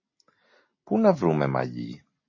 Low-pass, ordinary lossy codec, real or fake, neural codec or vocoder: 7.2 kHz; MP3, 32 kbps; real; none